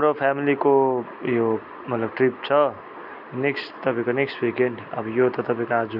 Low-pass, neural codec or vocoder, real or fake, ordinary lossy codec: 5.4 kHz; none; real; MP3, 48 kbps